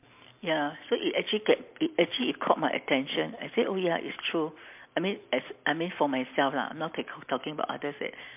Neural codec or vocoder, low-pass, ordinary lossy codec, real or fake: none; 3.6 kHz; MP3, 32 kbps; real